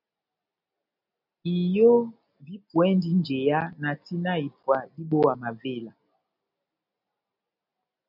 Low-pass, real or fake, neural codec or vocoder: 5.4 kHz; real; none